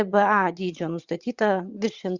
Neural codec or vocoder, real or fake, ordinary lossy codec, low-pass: vocoder, 22.05 kHz, 80 mel bands, WaveNeXt; fake; Opus, 64 kbps; 7.2 kHz